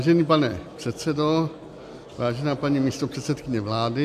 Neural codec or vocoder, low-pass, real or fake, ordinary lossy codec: none; 14.4 kHz; real; MP3, 96 kbps